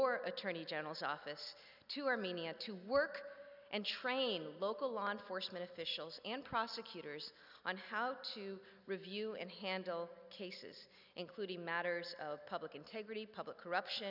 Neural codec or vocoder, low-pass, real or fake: none; 5.4 kHz; real